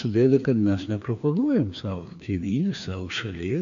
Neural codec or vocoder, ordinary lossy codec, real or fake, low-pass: codec, 16 kHz, 2 kbps, FreqCodec, larger model; AAC, 64 kbps; fake; 7.2 kHz